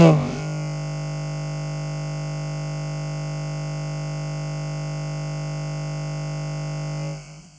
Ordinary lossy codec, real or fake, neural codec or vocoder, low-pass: none; fake; codec, 16 kHz, about 1 kbps, DyCAST, with the encoder's durations; none